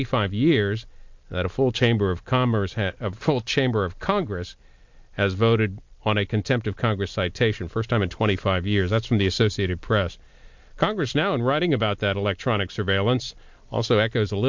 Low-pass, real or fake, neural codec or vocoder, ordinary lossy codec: 7.2 kHz; real; none; MP3, 64 kbps